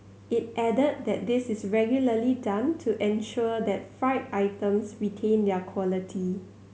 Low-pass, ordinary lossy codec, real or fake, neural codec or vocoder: none; none; real; none